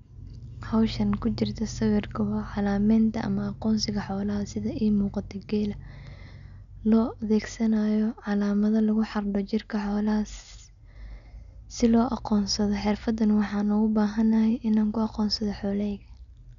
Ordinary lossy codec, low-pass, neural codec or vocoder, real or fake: none; 7.2 kHz; none; real